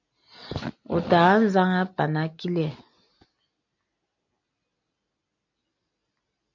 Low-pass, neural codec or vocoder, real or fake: 7.2 kHz; none; real